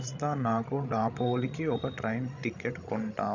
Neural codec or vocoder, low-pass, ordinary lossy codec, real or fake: codec, 16 kHz, 16 kbps, FreqCodec, larger model; 7.2 kHz; none; fake